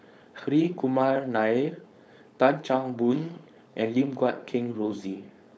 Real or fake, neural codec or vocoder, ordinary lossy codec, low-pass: fake; codec, 16 kHz, 4.8 kbps, FACodec; none; none